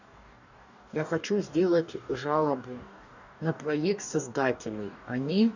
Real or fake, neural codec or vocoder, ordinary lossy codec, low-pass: fake; codec, 44.1 kHz, 2.6 kbps, DAC; none; 7.2 kHz